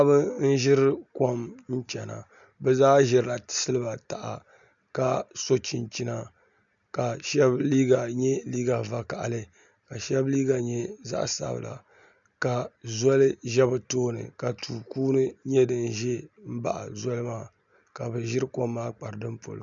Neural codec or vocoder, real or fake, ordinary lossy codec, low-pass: none; real; MP3, 96 kbps; 7.2 kHz